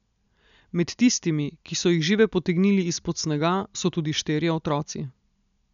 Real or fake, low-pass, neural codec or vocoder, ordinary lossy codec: real; 7.2 kHz; none; none